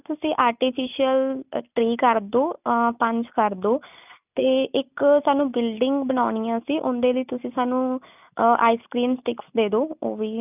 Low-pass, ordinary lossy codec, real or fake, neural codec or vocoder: 3.6 kHz; none; real; none